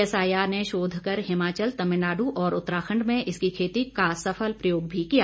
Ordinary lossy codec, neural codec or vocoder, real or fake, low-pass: none; none; real; none